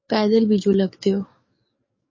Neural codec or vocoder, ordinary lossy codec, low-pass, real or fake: codec, 44.1 kHz, 7.8 kbps, DAC; MP3, 32 kbps; 7.2 kHz; fake